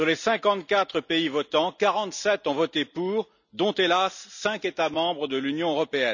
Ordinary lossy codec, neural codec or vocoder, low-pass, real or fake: none; none; 7.2 kHz; real